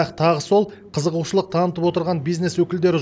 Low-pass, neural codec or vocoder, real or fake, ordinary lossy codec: none; none; real; none